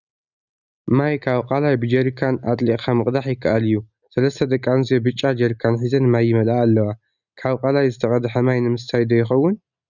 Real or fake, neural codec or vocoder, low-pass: real; none; 7.2 kHz